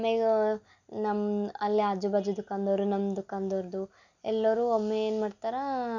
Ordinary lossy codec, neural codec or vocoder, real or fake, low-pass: none; none; real; 7.2 kHz